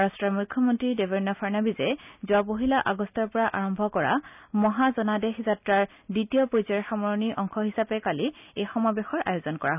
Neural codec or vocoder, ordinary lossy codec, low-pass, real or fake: none; none; 3.6 kHz; real